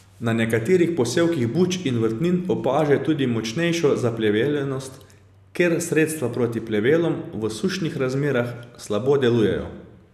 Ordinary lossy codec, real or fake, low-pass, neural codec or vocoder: none; real; 14.4 kHz; none